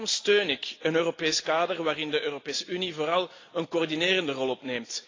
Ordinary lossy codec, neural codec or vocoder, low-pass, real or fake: AAC, 32 kbps; none; 7.2 kHz; real